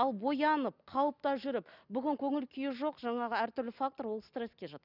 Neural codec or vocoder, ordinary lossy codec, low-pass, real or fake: none; none; 5.4 kHz; real